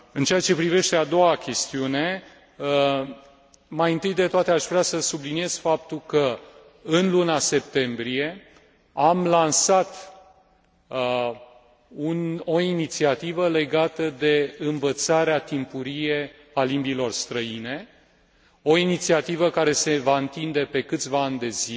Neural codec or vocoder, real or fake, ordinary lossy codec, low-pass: none; real; none; none